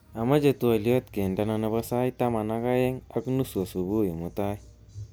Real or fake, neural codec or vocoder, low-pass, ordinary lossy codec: real; none; none; none